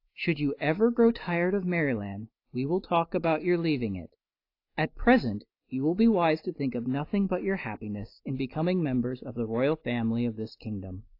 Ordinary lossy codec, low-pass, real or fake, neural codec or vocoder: AAC, 32 kbps; 5.4 kHz; real; none